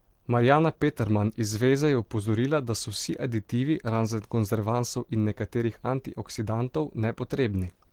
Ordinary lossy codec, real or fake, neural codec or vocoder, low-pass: Opus, 16 kbps; fake; vocoder, 44.1 kHz, 128 mel bands every 512 samples, BigVGAN v2; 19.8 kHz